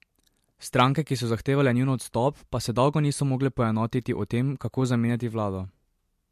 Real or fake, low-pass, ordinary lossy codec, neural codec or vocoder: real; 14.4 kHz; MP3, 64 kbps; none